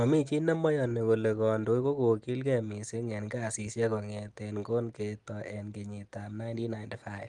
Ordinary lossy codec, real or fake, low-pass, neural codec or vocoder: Opus, 24 kbps; real; 10.8 kHz; none